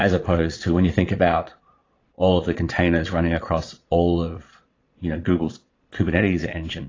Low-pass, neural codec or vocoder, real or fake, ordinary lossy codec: 7.2 kHz; vocoder, 22.05 kHz, 80 mel bands, Vocos; fake; AAC, 32 kbps